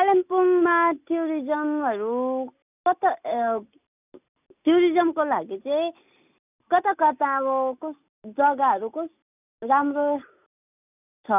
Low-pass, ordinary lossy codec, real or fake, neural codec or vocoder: 3.6 kHz; none; real; none